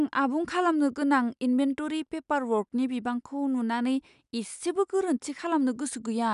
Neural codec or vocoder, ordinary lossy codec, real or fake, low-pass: none; none; real; 10.8 kHz